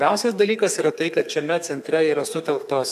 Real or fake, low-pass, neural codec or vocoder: fake; 14.4 kHz; codec, 32 kHz, 1.9 kbps, SNAC